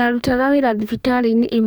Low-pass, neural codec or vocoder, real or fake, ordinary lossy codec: none; codec, 44.1 kHz, 2.6 kbps, DAC; fake; none